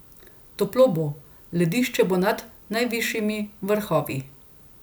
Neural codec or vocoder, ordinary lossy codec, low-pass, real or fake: none; none; none; real